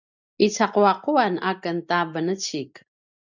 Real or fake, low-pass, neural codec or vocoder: real; 7.2 kHz; none